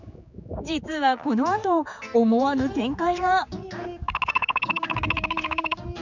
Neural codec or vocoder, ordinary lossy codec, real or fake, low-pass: codec, 16 kHz, 4 kbps, X-Codec, HuBERT features, trained on general audio; none; fake; 7.2 kHz